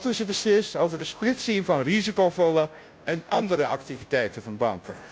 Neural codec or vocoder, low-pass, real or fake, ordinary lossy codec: codec, 16 kHz, 0.5 kbps, FunCodec, trained on Chinese and English, 25 frames a second; none; fake; none